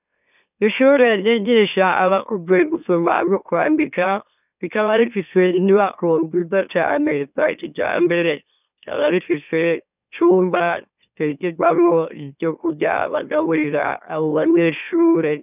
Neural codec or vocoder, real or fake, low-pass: autoencoder, 44.1 kHz, a latent of 192 numbers a frame, MeloTTS; fake; 3.6 kHz